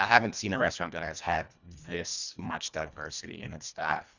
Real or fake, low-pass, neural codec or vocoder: fake; 7.2 kHz; codec, 24 kHz, 1.5 kbps, HILCodec